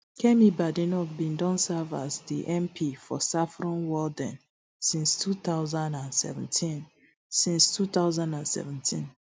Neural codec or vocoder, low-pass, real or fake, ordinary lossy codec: none; none; real; none